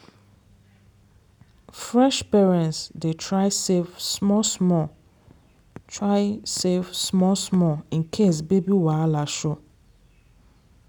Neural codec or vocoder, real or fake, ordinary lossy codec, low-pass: none; real; none; none